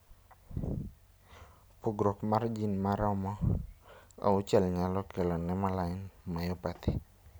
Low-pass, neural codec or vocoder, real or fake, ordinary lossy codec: none; none; real; none